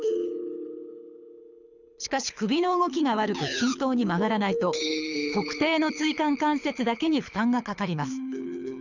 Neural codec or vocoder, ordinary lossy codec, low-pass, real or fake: codec, 24 kHz, 6 kbps, HILCodec; none; 7.2 kHz; fake